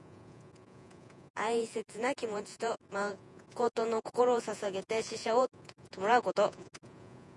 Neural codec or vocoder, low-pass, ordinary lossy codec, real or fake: vocoder, 48 kHz, 128 mel bands, Vocos; 10.8 kHz; MP3, 96 kbps; fake